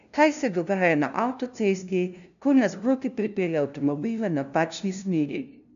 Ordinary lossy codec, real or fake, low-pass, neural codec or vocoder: none; fake; 7.2 kHz; codec, 16 kHz, 0.5 kbps, FunCodec, trained on LibriTTS, 25 frames a second